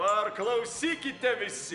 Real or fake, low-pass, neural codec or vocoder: real; 10.8 kHz; none